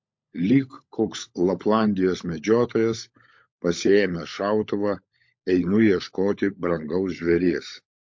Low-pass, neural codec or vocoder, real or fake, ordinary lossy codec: 7.2 kHz; codec, 16 kHz, 16 kbps, FunCodec, trained on LibriTTS, 50 frames a second; fake; MP3, 48 kbps